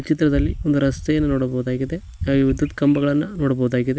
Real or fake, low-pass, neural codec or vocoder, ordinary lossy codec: real; none; none; none